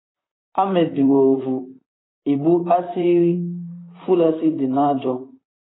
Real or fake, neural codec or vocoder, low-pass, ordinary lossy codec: fake; vocoder, 44.1 kHz, 128 mel bands, Pupu-Vocoder; 7.2 kHz; AAC, 16 kbps